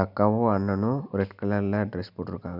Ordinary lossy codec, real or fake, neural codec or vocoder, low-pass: none; real; none; 5.4 kHz